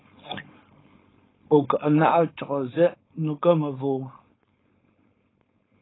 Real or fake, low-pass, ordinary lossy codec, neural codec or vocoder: fake; 7.2 kHz; AAC, 16 kbps; codec, 16 kHz, 4.8 kbps, FACodec